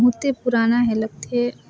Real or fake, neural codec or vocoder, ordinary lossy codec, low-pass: real; none; none; none